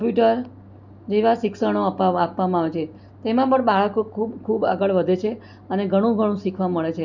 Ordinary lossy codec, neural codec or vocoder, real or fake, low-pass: none; none; real; 7.2 kHz